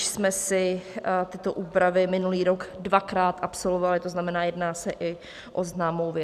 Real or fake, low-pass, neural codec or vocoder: real; 14.4 kHz; none